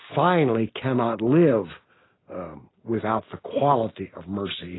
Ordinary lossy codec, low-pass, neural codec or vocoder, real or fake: AAC, 16 kbps; 7.2 kHz; none; real